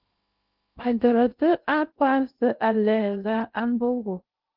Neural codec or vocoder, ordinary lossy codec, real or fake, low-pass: codec, 16 kHz in and 24 kHz out, 0.6 kbps, FocalCodec, streaming, 2048 codes; Opus, 32 kbps; fake; 5.4 kHz